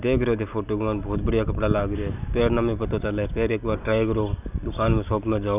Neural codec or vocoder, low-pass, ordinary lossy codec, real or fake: none; 3.6 kHz; none; real